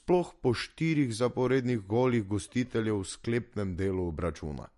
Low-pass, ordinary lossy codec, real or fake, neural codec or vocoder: 14.4 kHz; MP3, 48 kbps; real; none